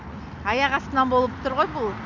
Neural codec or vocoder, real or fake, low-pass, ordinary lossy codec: none; real; 7.2 kHz; none